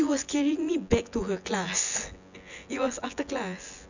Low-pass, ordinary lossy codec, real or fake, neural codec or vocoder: 7.2 kHz; none; fake; vocoder, 24 kHz, 100 mel bands, Vocos